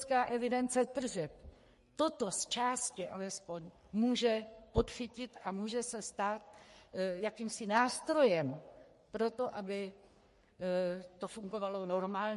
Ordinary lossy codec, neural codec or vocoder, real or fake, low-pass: MP3, 48 kbps; codec, 44.1 kHz, 3.4 kbps, Pupu-Codec; fake; 14.4 kHz